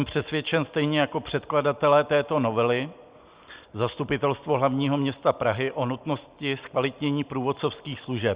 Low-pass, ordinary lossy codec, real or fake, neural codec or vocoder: 3.6 kHz; Opus, 64 kbps; real; none